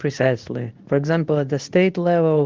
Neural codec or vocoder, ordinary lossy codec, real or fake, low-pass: codec, 24 kHz, 0.9 kbps, WavTokenizer, medium speech release version 2; Opus, 32 kbps; fake; 7.2 kHz